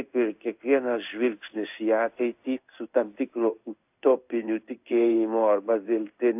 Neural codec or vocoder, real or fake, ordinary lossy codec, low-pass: codec, 16 kHz in and 24 kHz out, 1 kbps, XY-Tokenizer; fake; AAC, 32 kbps; 3.6 kHz